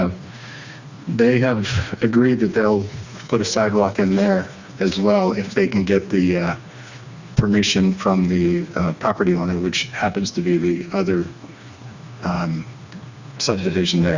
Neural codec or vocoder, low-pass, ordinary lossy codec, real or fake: codec, 16 kHz, 2 kbps, FreqCodec, smaller model; 7.2 kHz; Opus, 64 kbps; fake